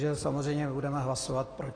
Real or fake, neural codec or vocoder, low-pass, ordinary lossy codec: real; none; 9.9 kHz; AAC, 32 kbps